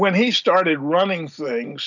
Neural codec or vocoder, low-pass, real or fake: none; 7.2 kHz; real